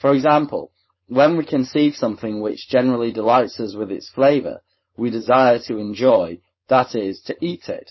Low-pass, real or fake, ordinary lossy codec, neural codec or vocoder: 7.2 kHz; fake; MP3, 24 kbps; codec, 16 kHz, 4.8 kbps, FACodec